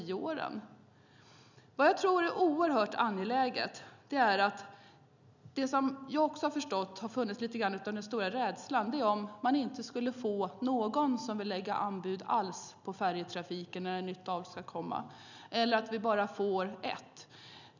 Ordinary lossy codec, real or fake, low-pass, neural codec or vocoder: none; real; 7.2 kHz; none